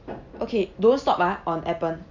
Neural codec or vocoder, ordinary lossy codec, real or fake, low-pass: none; none; real; 7.2 kHz